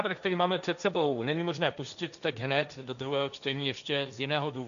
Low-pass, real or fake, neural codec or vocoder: 7.2 kHz; fake; codec, 16 kHz, 1.1 kbps, Voila-Tokenizer